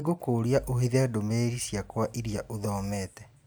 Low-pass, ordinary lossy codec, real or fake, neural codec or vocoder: none; none; real; none